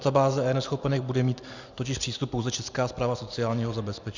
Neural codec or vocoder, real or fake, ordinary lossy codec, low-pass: none; real; Opus, 64 kbps; 7.2 kHz